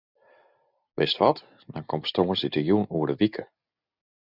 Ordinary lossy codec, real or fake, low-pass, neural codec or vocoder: Opus, 64 kbps; real; 5.4 kHz; none